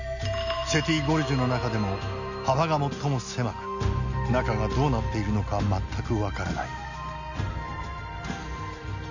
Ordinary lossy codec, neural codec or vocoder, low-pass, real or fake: none; none; 7.2 kHz; real